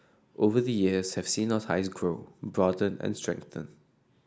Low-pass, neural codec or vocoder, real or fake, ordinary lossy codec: none; none; real; none